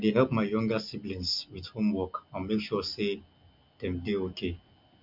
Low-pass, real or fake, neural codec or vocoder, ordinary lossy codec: 5.4 kHz; real; none; MP3, 48 kbps